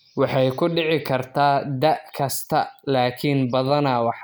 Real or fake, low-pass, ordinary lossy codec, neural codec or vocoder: real; none; none; none